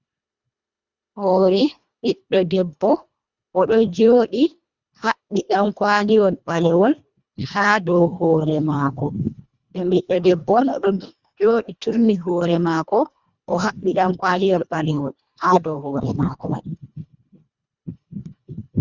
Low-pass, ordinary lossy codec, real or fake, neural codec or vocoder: 7.2 kHz; Opus, 64 kbps; fake; codec, 24 kHz, 1.5 kbps, HILCodec